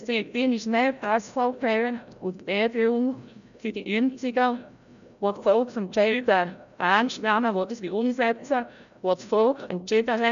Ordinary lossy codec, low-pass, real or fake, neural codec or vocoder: none; 7.2 kHz; fake; codec, 16 kHz, 0.5 kbps, FreqCodec, larger model